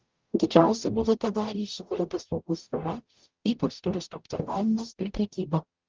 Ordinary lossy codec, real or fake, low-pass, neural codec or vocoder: Opus, 32 kbps; fake; 7.2 kHz; codec, 44.1 kHz, 0.9 kbps, DAC